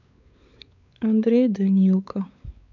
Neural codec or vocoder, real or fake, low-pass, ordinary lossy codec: codec, 16 kHz, 4 kbps, X-Codec, WavLM features, trained on Multilingual LibriSpeech; fake; 7.2 kHz; none